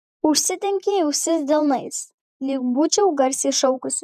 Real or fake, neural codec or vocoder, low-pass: fake; vocoder, 48 kHz, 128 mel bands, Vocos; 14.4 kHz